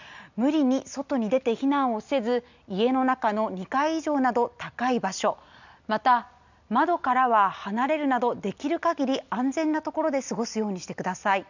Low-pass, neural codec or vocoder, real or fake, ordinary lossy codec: 7.2 kHz; none; real; none